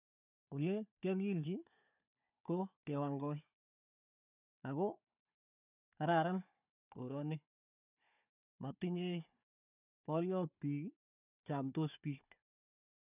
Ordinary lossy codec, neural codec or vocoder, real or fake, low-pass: none; codec, 16 kHz, 4 kbps, FunCodec, trained on Chinese and English, 50 frames a second; fake; 3.6 kHz